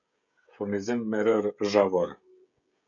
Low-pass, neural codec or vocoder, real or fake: 7.2 kHz; codec, 16 kHz, 16 kbps, FreqCodec, smaller model; fake